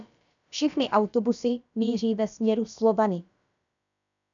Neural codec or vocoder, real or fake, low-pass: codec, 16 kHz, about 1 kbps, DyCAST, with the encoder's durations; fake; 7.2 kHz